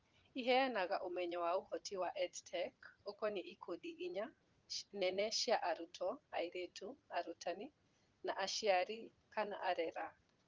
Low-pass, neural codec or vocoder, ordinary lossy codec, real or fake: 7.2 kHz; vocoder, 44.1 kHz, 80 mel bands, Vocos; Opus, 24 kbps; fake